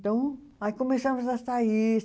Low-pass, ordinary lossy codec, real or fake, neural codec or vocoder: none; none; real; none